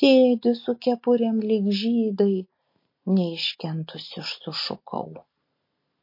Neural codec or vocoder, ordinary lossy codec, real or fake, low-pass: none; MP3, 32 kbps; real; 5.4 kHz